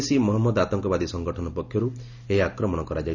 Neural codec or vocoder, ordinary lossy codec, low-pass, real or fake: none; none; 7.2 kHz; real